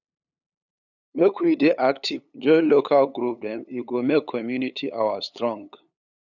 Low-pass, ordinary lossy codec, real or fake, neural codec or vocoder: 7.2 kHz; none; fake; codec, 16 kHz, 8 kbps, FunCodec, trained on LibriTTS, 25 frames a second